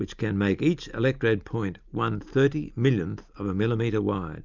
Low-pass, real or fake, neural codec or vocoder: 7.2 kHz; real; none